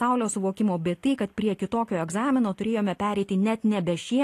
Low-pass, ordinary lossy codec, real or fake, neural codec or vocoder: 14.4 kHz; AAC, 48 kbps; real; none